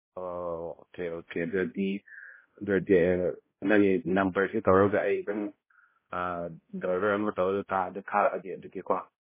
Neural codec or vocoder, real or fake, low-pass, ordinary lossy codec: codec, 16 kHz, 0.5 kbps, X-Codec, HuBERT features, trained on balanced general audio; fake; 3.6 kHz; MP3, 16 kbps